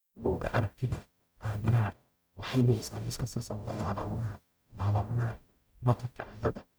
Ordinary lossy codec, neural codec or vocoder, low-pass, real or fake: none; codec, 44.1 kHz, 0.9 kbps, DAC; none; fake